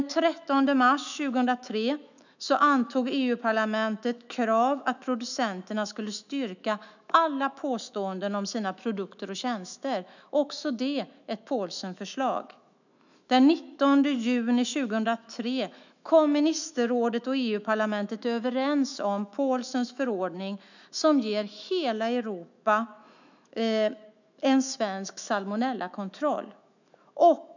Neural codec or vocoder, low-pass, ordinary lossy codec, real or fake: autoencoder, 48 kHz, 128 numbers a frame, DAC-VAE, trained on Japanese speech; 7.2 kHz; none; fake